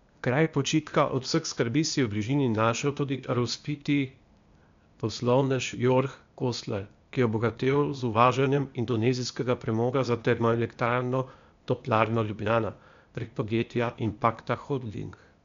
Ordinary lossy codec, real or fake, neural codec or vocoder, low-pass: MP3, 64 kbps; fake; codec, 16 kHz, 0.8 kbps, ZipCodec; 7.2 kHz